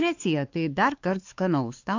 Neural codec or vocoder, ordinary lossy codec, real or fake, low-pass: codec, 16 kHz, 4 kbps, X-Codec, WavLM features, trained on Multilingual LibriSpeech; AAC, 48 kbps; fake; 7.2 kHz